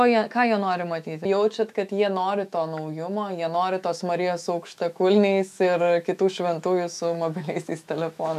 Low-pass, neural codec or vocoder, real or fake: 14.4 kHz; autoencoder, 48 kHz, 128 numbers a frame, DAC-VAE, trained on Japanese speech; fake